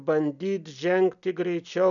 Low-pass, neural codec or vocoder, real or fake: 7.2 kHz; none; real